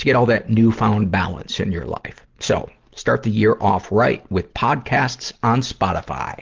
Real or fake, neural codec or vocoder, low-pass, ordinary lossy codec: real; none; 7.2 kHz; Opus, 16 kbps